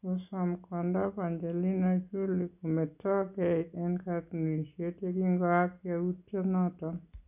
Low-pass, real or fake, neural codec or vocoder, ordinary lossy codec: 3.6 kHz; real; none; none